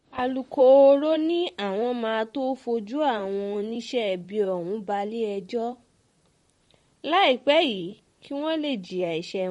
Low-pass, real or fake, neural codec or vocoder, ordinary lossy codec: 19.8 kHz; fake; vocoder, 44.1 kHz, 128 mel bands, Pupu-Vocoder; MP3, 48 kbps